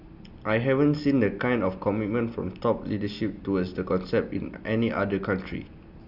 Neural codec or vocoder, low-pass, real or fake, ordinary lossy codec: none; 5.4 kHz; real; none